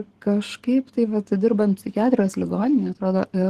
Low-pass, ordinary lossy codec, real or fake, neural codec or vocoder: 14.4 kHz; Opus, 16 kbps; fake; codec, 44.1 kHz, 7.8 kbps, DAC